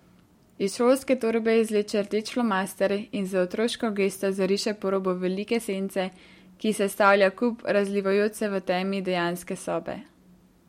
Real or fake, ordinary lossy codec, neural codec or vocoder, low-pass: real; MP3, 64 kbps; none; 19.8 kHz